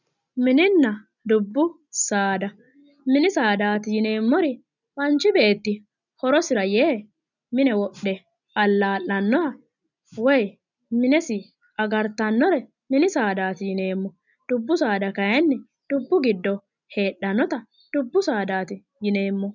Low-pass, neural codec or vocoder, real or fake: 7.2 kHz; none; real